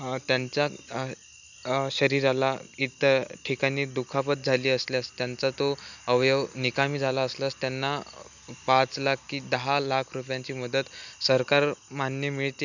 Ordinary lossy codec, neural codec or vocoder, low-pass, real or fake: none; none; 7.2 kHz; real